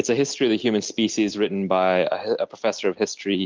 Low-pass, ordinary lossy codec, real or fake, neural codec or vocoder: 7.2 kHz; Opus, 32 kbps; real; none